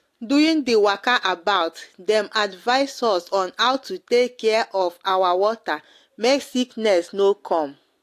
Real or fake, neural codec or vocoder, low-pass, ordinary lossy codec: real; none; 14.4 kHz; AAC, 64 kbps